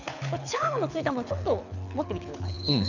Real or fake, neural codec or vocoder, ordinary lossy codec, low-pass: fake; codec, 24 kHz, 6 kbps, HILCodec; none; 7.2 kHz